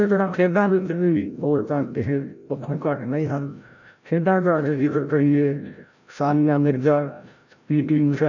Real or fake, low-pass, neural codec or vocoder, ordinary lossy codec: fake; 7.2 kHz; codec, 16 kHz, 0.5 kbps, FreqCodec, larger model; none